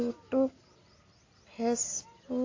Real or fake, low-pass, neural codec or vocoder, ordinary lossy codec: real; 7.2 kHz; none; AAC, 32 kbps